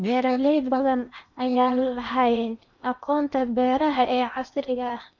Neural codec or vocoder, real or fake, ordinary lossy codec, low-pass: codec, 16 kHz in and 24 kHz out, 0.8 kbps, FocalCodec, streaming, 65536 codes; fake; none; 7.2 kHz